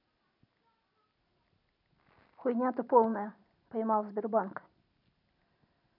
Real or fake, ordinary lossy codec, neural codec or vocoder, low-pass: real; none; none; 5.4 kHz